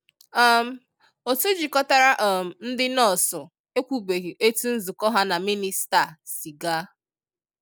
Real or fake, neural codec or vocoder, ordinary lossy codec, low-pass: real; none; none; none